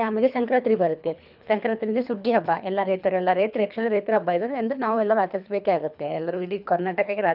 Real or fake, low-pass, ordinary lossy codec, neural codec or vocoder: fake; 5.4 kHz; none; codec, 24 kHz, 3 kbps, HILCodec